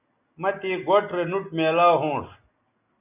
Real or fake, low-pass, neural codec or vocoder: real; 3.6 kHz; none